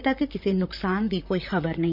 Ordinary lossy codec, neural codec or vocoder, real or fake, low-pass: none; vocoder, 44.1 kHz, 128 mel bands, Pupu-Vocoder; fake; 5.4 kHz